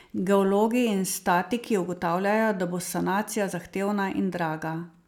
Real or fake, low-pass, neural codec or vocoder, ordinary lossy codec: real; 19.8 kHz; none; none